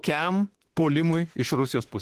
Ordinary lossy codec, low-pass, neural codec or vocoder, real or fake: Opus, 16 kbps; 14.4 kHz; autoencoder, 48 kHz, 32 numbers a frame, DAC-VAE, trained on Japanese speech; fake